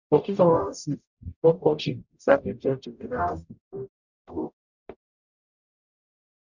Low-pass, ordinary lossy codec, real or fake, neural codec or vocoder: 7.2 kHz; none; fake; codec, 44.1 kHz, 0.9 kbps, DAC